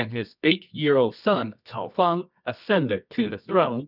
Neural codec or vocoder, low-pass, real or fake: codec, 24 kHz, 0.9 kbps, WavTokenizer, medium music audio release; 5.4 kHz; fake